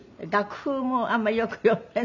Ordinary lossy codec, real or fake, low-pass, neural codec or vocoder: none; real; 7.2 kHz; none